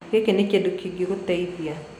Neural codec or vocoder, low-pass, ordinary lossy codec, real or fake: none; 19.8 kHz; none; real